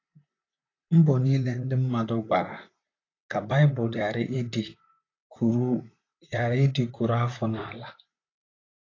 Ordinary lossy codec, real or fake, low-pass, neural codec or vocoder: AAC, 32 kbps; fake; 7.2 kHz; vocoder, 44.1 kHz, 128 mel bands, Pupu-Vocoder